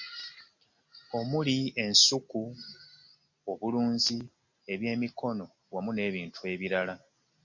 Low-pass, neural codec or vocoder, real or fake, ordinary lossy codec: 7.2 kHz; none; real; MP3, 48 kbps